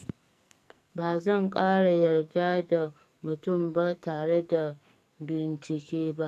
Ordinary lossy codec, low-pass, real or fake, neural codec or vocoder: none; 14.4 kHz; fake; codec, 44.1 kHz, 2.6 kbps, SNAC